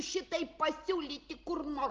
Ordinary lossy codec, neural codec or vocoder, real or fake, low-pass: Opus, 16 kbps; none; real; 7.2 kHz